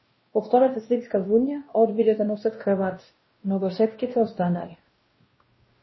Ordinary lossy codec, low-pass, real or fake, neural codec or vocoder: MP3, 24 kbps; 7.2 kHz; fake; codec, 16 kHz, 1 kbps, X-Codec, WavLM features, trained on Multilingual LibriSpeech